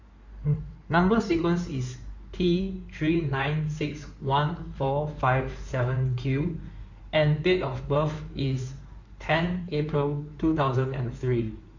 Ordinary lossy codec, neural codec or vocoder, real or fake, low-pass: none; codec, 16 kHz in and 24 kHz out, 2.2 kbps, FireRedTTS-2 codec; fake; 7.2 kHz